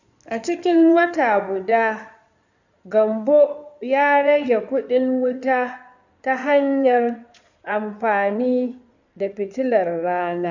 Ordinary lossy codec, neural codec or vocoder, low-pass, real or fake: none; codec, 16 kHz in and 24 kHz out, 2.2 kbps, FireRedTTS-2 codec; 7.2 kHz; fake